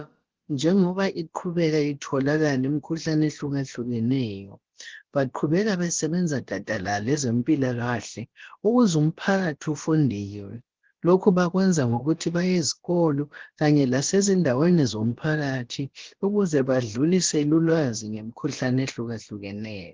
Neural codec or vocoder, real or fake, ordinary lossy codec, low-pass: codec, 16 kHz, about 1 kbps, DyCAST, with the encoder's durations; fake; Opus, 16 kbps; 7.2 kHz